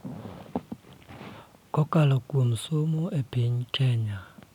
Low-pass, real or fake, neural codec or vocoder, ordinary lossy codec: 19.8 kHz; real; none; none